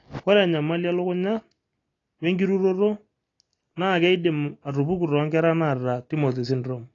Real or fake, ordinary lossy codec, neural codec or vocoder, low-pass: real; AAC, 32 kbps; none; 7.2 kHz